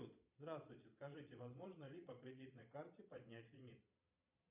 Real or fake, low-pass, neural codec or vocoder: fake; 3.6 kHz; vocoder, 22.05 kHz, 80 mel bands, Vocos